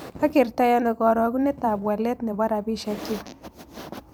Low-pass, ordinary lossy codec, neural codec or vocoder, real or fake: none; none; vocoder, 44.1 kHz, 128 mel bands every 256 samples, BigVGAN v2; fake